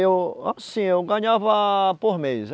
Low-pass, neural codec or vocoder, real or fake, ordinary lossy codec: none; none; real; none